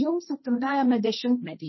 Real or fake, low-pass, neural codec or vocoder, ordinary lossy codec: fake; 7.2 kHz; codec, 16 kHz, 1.1 kbps, Voila-Tokenizer; MP3, 24 kbps